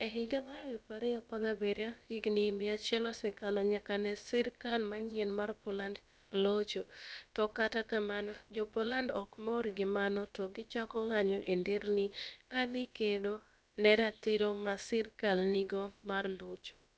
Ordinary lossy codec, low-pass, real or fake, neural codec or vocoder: none; none; fake; codec, 16 kHz, about 1 kbps, DyCAST, with the encoder's durations